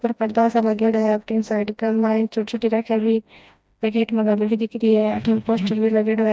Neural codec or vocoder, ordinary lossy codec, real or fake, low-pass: codec, 16 kHz, 1 kbps, FreqCodec, smaller model; none; fake; none